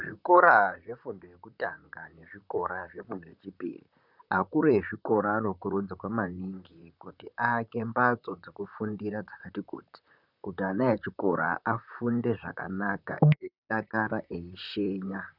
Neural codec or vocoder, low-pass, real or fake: vocoder, 44.1 kHz, 128 mel bands, Pupu-Vocoder; 5.4 kHz; fake